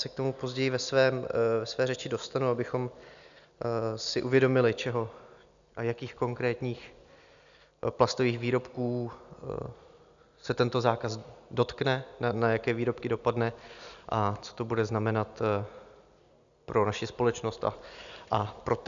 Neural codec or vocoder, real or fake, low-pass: none; real; 7.2 kHz